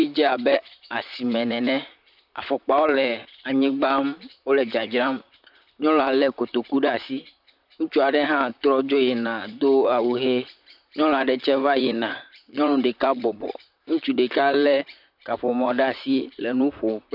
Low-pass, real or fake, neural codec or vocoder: 5.4 kHz; fake; vocoder, 44.1 kHz, 128 mel bands, Pupu-Vocoder